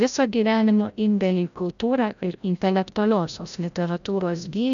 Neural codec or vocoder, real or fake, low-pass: codec, 16 kHz, 0.5 kbps, FreqCodec, larger model; fake; 7.2 kHz